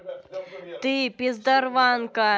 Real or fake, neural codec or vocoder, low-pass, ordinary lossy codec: real; none; none; none